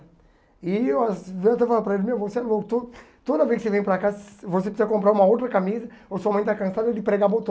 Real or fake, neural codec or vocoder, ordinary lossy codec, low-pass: real; none; none; none